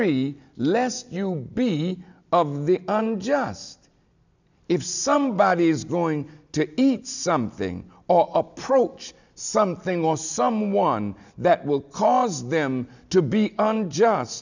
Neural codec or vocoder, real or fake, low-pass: none; real; 7.2 kHz